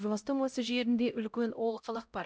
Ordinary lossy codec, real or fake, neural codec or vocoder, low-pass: none; fake; codec, 16 kHz, 0.5 kbps, X-Codec, HuBERT features, trained on LibriSpeech; none